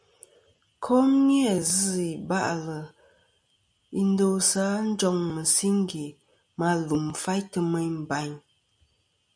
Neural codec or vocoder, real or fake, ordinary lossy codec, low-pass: none; real; MP3, 96 kbps; 9.9 kHz